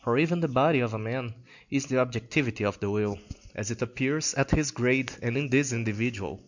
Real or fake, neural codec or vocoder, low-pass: real; none; 7.2 kHz